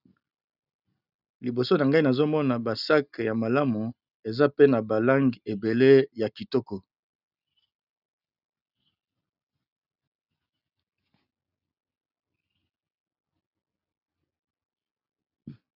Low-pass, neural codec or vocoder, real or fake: 5.4 kHz; none; real